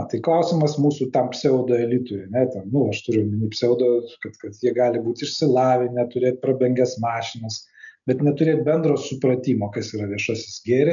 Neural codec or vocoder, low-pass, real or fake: none; 7.2 kHz; real